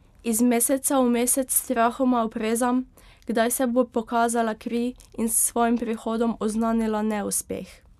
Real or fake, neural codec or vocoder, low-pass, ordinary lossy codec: real; none; 14.4 kHz; none